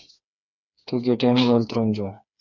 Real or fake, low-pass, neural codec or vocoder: fake; 7.2 kHz; codec, 16 kHz, 4 kbps, FreqCodec, smaller model